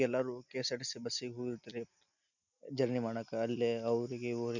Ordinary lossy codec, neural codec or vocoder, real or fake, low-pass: none; none; real; 7.2 kHz